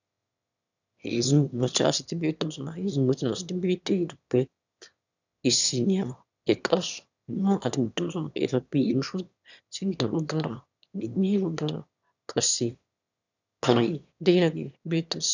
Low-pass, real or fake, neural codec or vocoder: 7.2 kHz; fake; autoencoder, 22.05 kHz, a latent of 192 numbers a frame, VITS, trained on one speaker